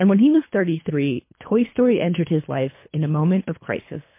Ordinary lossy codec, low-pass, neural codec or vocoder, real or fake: MP3, 24 kbps; 3.6 kHz; codec, 24 kHz, 3 kbps, HILCodec; fake